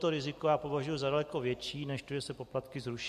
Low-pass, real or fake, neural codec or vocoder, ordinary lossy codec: 14.4 kHz; real; none; MP3, 64 kbps